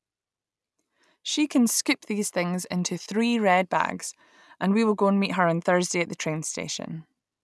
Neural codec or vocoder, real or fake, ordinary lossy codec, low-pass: none; real; none; none